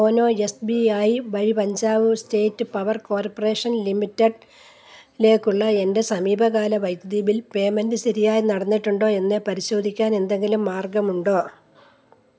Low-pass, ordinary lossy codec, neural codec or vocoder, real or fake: none; none; none; real